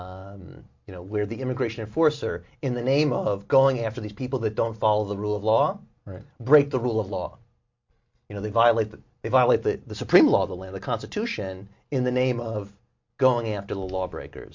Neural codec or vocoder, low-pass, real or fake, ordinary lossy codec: none; 7.2 kHz; real; MP3, 48 kbps